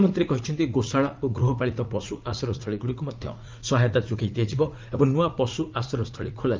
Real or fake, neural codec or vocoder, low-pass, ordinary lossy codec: fake; vocoder, 44.1 kHz, 80 mel bands, Vocos; 7.2 kHz; Opus, 32 kbps